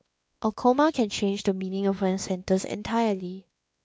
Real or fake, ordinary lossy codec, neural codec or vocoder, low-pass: fake; none; codec, 16 kHz, 2 kbps, X-Codec, WavLM features, trained on Multilingual LibriSpeech; none